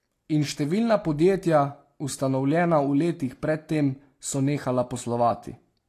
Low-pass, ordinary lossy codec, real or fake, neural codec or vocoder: 14.4 kHz; AAC, 48 kbps; real; none